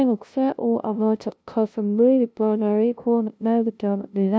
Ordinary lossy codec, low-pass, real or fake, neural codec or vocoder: none; none; fake; codec, 16 kHz, 0.5 kbps, FunCodec, trained on LibriTTS, 25 frames a second